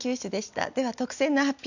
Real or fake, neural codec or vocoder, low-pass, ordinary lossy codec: real; none; 7.2 kHz; none